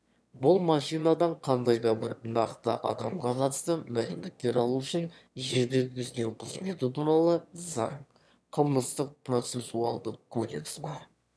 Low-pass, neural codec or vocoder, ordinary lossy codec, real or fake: none; autoencoder, 22.05 kHz, a latent of 192 numbers a frame, VITS, trained on one speaker; none; fake